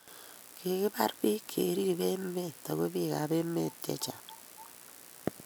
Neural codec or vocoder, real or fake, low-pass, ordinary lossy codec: none; real; none; none